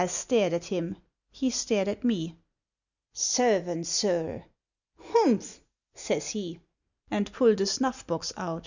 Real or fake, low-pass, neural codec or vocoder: real; 7.2 kHz; none